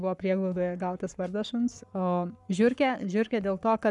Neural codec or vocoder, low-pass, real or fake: codec, 44.1 kHz, 7.8 kbps, Pupu-Codec; 10.8 kHz; fake